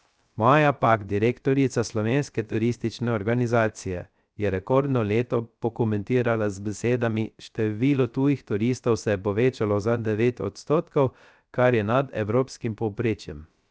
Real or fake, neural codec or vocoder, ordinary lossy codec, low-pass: fake; codec, 16 kHz, 0.3 kbps, FocalCodec; none; none